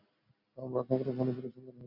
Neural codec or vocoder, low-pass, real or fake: none; 5.4 kHz; real